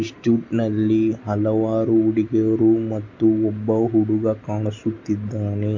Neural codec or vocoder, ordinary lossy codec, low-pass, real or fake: none; MP3, 48 kbps; 7.2 kHz; real